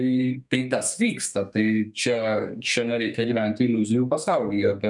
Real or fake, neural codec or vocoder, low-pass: fake; codec, 32 kHz, 1.9 kbps, SNAC; 10.8 kHz